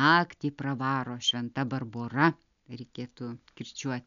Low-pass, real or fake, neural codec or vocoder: 7.2 kHz; real; none